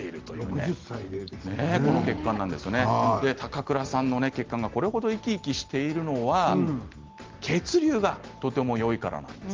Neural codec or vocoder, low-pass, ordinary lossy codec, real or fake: none; 7.2 kHz; Opus, 16 kbps; real